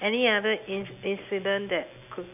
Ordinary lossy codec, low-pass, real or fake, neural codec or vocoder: none; 3.6 kHz; real; none